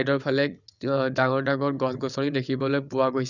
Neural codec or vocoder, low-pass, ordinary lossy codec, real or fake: vocoder, 22.05 kHz, 80 mel bands, WaveNeXt; 7.2 kHz; none; fake